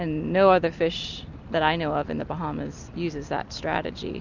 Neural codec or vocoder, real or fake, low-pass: none; real; 7.2 kHz